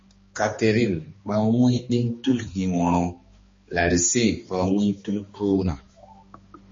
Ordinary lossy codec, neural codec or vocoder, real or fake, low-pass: MP3, 32 kbps; codec, 16 kHz, 2 kbps, X-Codec, HuBERT features, trained on balanced general audio; fake; 7.2 kHz